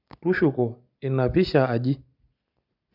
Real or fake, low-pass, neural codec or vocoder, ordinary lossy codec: real; 5.4 kHz; none; none